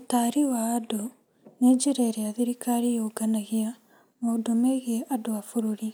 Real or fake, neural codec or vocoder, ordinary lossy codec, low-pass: real; none; none; none